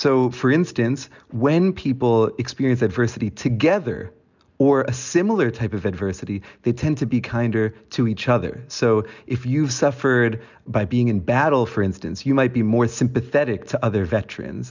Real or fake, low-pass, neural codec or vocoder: real; 7.2 kHz; none